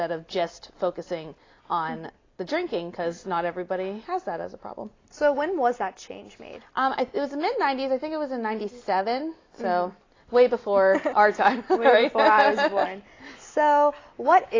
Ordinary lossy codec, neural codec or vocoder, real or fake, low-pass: AAC, 32 kbps; none; real; 7.2 kHz